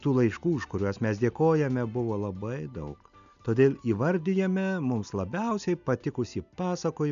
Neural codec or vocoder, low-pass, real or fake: none; 7.2 kHz; real